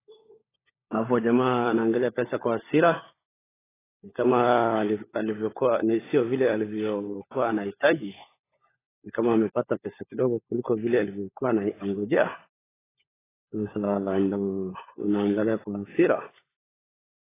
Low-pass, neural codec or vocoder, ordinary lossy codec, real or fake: 3.6 kHz; codec, 16 kHz, 16 kbps, FunCodec, trained on LibriTTS, 50 frames a second; AAC, 16 kbps; fake